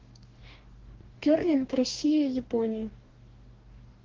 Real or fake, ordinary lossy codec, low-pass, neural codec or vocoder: fake; Opus, 16 kbps; 7.2 kHz; codec, 44.1 kHz, 2.6 kbps, DAC